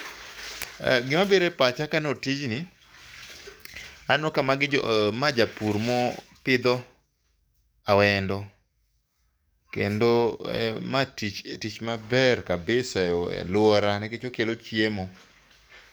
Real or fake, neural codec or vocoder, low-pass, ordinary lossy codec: fake; codec, 44.1 kHz, 7.8 kbps, DAC; none; none